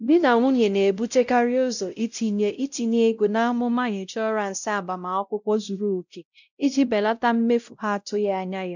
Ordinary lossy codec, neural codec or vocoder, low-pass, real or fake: none; codec, 16 kHz, 0.5 kbps, X-Codec, WavLM features, trained on Multilingual LibriSpeech; 7.2 kHz; fake